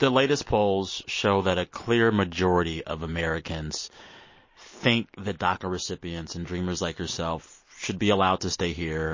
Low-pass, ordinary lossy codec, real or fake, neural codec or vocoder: 7.2 kHz; MP3, 32 kbps; real; none